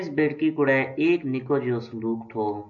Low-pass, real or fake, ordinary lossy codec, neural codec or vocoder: 7.2 kHz; real; AAC, 64 kbps; none